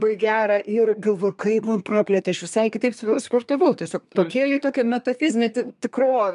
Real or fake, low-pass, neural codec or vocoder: fake; 10.8 kHz; codec, 24 kHz, 1 kbps, SNAC